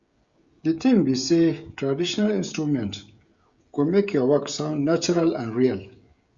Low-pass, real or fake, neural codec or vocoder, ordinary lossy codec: 7.2 kHz; fake; codec, 16 kHz, 16 kbps, FreqCodec, smaller model; Opus, 64 kbps